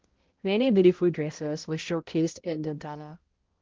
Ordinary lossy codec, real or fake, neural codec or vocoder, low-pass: Opus, 16 kbps; fake; codec, 16 kHz, 0.5 kbps, X-Codec, HuBERT features, trained on balanced general audio; 7.2 kHz